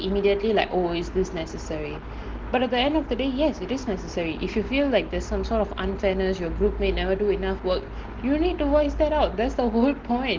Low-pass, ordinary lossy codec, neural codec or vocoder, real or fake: 7.2 kHz; Opus, 16 kbps; none; real